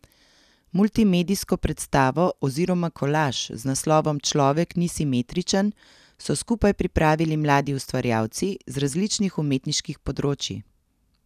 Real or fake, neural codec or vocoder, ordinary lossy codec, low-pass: real; none; none; 14.4 kHz